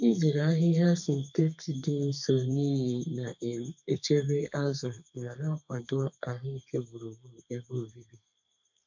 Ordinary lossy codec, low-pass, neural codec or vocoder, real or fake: none; 7.2 kHz; codec, 32 kHz, 1.9 kbps, SNAC; fake